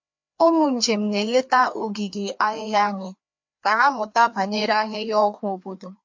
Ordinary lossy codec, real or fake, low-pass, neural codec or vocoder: MP3, 48 kbps; fake; 7.2 kHz; codec, 16 kHz, 2 kbps, FreqCodec, larger model